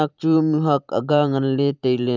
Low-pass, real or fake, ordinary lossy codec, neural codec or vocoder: 7.2 kHz; fake; none; vocoder, 44.1 kHz, 128 mel bands every 512 samples, BigVGAN v2